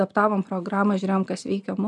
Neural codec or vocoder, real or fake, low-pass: none; real; 10.8 kHz